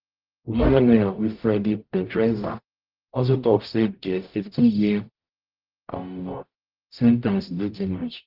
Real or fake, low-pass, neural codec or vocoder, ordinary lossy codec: fake; 5.4 kHz; codec, 44.1 kHz, 0.9 kbps, DAC; Opus, 32 kbps